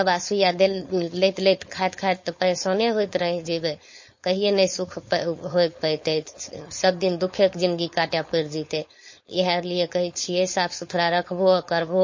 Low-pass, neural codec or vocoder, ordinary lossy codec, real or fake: 7.2 kHz; codec, 16 kHz, 4.8 kbps, FACodec; MP3, 32 kbps; fake